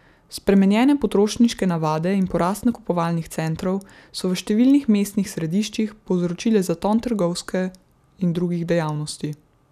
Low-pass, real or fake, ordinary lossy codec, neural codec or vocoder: 14.4 kHz; real; none; none